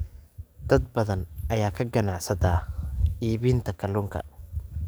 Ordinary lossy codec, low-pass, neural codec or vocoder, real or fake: none; none; codec, 44.1 kHz, 7.8 kbps, DAC; fake